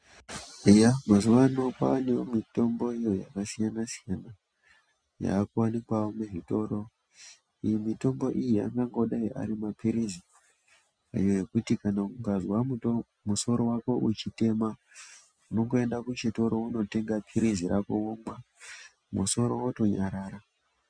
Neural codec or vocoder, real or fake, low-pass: none; real; 9.9 kHz